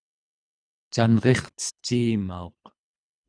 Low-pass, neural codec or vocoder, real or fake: 9.9 kHz; codec, 24 kHz, 3 kbps, HILCodec; fake